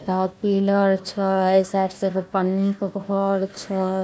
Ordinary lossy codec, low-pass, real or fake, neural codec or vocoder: none; none; fake; codec, 16 kHz, 1 kbps, FunCodec, trained on Chinese and English, 50 frames a second